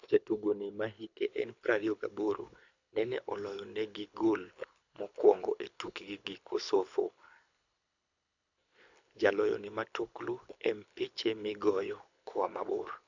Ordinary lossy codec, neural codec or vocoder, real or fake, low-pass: none; codec, 24 kHz, 6 kbps, HILCodec; fake; 7.2 kHz